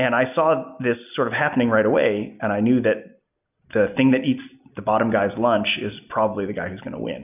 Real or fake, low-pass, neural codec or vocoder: real; 3.6 kHz; none